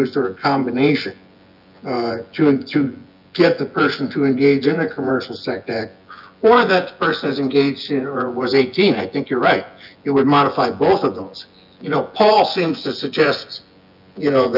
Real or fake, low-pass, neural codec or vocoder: fake; 5.4 kHz; vocoder, 24 kHz, 100 mel bands, Vocos